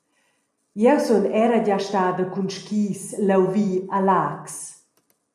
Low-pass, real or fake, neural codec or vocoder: 14.4 kHz; real; none